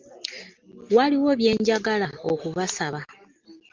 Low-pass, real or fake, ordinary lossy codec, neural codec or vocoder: 7.2 kHz; real; Opus, 32 kbps; none